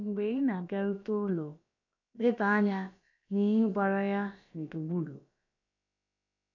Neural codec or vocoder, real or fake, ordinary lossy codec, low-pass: codec, 16 kHz, about 1 kbps, DyCAST, with the encoder's durations; fake; none; 7.2 kHz